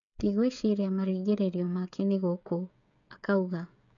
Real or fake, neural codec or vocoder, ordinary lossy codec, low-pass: fake; codec, 16 kHz, 16 kbps, FreqCodec, smaller model; none; 7.2 kHz